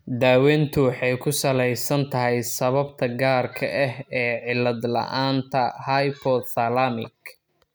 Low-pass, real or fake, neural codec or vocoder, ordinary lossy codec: none; real; none; none